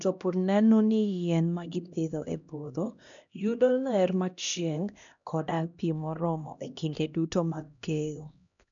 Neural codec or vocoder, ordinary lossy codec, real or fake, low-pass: codec, 16 kHz, 1 kbps, X-Codec, HuBERT features, trained on LibriSpeech; none; fake; 7.2 kHz